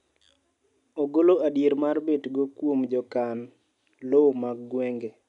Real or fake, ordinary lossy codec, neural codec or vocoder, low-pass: real; none; none; 10.8 kHz